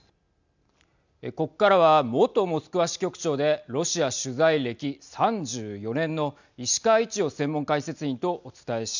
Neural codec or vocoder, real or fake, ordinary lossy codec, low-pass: none; real; none; 7.2 kHz